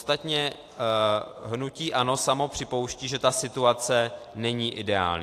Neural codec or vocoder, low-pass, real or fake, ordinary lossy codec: vocoder, 44.1 kHz, 128 mel bands every 512 samples, BigVGAN v2; 14.4 kHz; fake; AAC, 64 kbps